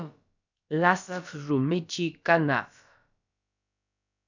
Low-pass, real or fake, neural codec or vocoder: 7.2 kHz; fake; codec, 16 kHz, about 1 kbps, DyCAST, with the encoder's durations